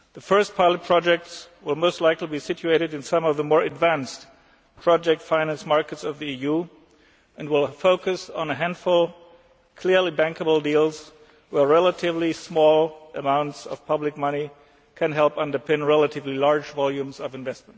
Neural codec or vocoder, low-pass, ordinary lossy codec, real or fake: none; none; none; real